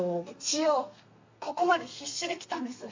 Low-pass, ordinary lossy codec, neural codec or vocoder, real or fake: 7.2 kHz; MP3, 48 kbps; codec, 32 kHz, 1.9 kbps, SNAC; fake